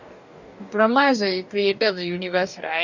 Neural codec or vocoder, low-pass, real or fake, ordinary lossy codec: codec, 44.1 kHz, 2.6 kbps, DAC; 7.2 kHz; fake; none